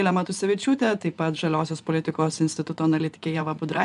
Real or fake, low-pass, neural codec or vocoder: fake; 10.8 kHz; vocoder, 24 kHz, 100 mel bands, Vocos